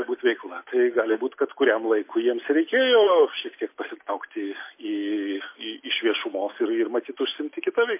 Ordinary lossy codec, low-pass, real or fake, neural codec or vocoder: MP3, 24 kbps; 3.6 kHz; real; none